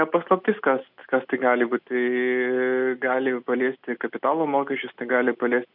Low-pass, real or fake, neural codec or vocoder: 5.4 kHz; real; none